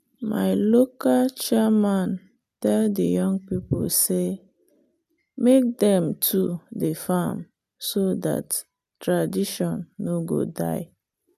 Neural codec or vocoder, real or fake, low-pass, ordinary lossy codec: none; real; 14.4 kHz; none